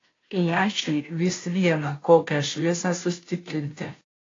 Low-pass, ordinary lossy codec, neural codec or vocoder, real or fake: 7.2 kHz; AAC, 32 kbps; codec, 16 kHz, 0.5 kbps, FunCodec, trained on Chinese and English, 25 frames a second; fake